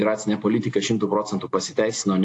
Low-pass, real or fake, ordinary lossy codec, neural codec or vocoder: 10.8 kHz; real; AAC, 48 kbps; none